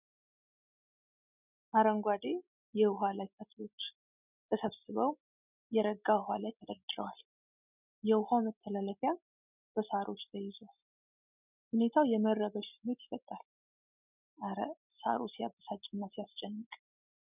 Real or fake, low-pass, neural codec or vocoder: real; 3.6 kHz; none